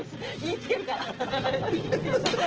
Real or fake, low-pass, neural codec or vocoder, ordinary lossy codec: real; 7.2 kHz; none; Opus, 16 kbps